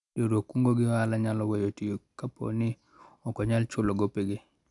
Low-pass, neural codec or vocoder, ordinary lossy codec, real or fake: 10.8 kHz; none; none; real